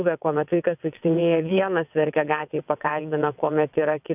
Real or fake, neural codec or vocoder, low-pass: fake; vocoder, 22.05 kHz, 80 mel bands, WaveNeXt; 3.6 kHz